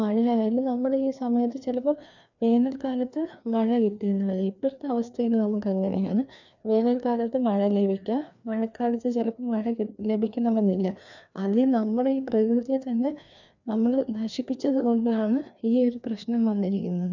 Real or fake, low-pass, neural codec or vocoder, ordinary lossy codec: fake; 7.2 kHz; codec, 16 kHz, 2 kbps, FreqCodec, larger model; none